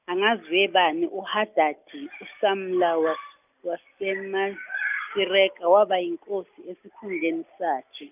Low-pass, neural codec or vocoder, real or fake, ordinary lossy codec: 3.6 kHz; none; real; none